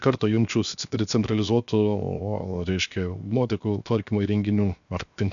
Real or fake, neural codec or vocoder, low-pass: fake; codec, 16 kHz, 0.7 kbps, FocalCodec; 7.2 kHz